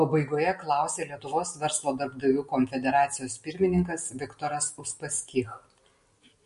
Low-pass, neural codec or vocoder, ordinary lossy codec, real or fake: 14.4 kHz; none; MP3, 48 kbps; real